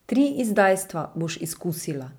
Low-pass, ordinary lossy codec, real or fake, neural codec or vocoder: none; none; real; none